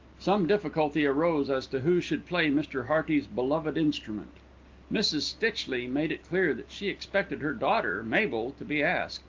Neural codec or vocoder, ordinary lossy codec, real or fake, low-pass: none; Opus, 32 kbps; real; 7.2 kHz